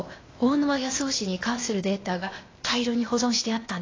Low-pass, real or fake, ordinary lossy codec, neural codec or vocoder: 7.2 kHz; fake; AAC, 32 kbps; codec, 16 kHz, 0.8 kbps, ZipCodec